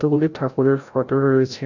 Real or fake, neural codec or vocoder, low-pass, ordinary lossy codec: fake; codec, 16 kHz, 0.5 kbps, FreqCodec, larger model; 7.2 kHz; none